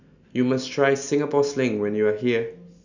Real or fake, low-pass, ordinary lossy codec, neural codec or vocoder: real; 7.2 kHz; none; none